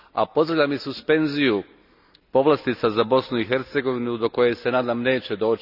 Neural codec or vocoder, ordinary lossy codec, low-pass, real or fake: none; none; 5.4 kHz; real